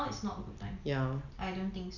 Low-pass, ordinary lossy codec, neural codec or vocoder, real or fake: 7.2 kHz; none; none; real